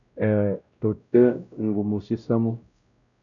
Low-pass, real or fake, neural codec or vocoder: 7.2 kHz; fake; codec, 16 kHz, 0.5 kbps, X-Codec, WavLM features, trained on Multilingual LibriSpeech